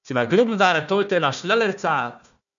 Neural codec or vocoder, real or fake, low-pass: codec, 16 kHz, 1 kbps, FunCodec, trained on Chinese and English, 50 frames a second; fake; 7.2 kHz